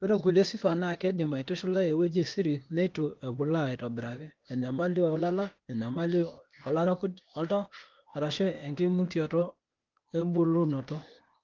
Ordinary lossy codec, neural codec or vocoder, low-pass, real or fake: Opus, 24 kbps; codec, 16 kHz, 0.8 kbps, ZipCodec; 7.2 kHz; fake